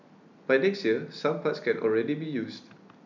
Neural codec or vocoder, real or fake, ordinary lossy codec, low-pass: none; real; none; 7.2 kHz